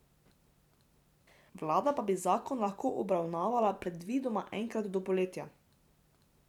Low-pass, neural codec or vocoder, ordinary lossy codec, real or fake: 19.8 kHz; vocoder, 44.1 kHz, 128 mel bands every 512 samples, BigVGAN v2; none; fake